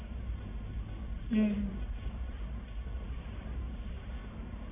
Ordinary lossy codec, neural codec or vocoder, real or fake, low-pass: none; codec, 44.1 kHz, 1.7 kbps, Pupu-Codec; fake; 3.6 kHz